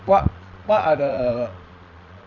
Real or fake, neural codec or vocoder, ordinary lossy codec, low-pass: fake; vocoder, 22.05 kHz, 80 mel bands, Vocos; none; 7.2 kHz